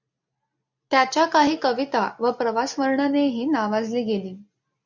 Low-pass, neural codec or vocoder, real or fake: 7.2 kHz; none; real